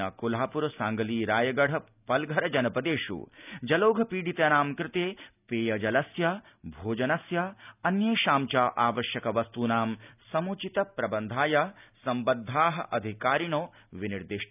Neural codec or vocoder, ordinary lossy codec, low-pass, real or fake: none; none; 3.6 kHz; real